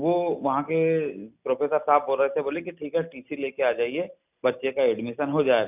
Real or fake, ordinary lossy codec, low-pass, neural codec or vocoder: real; none; 3.6 kHz; none